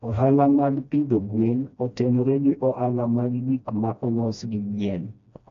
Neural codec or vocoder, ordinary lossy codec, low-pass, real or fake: codec, 16 kHz, 1 kbps, FreqCodec, smaller model; MP3, 48 kbps; 7.2 kHz; fake